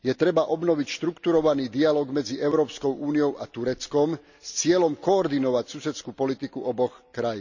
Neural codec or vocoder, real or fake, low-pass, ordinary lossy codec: none; real; 7.2 kHz; none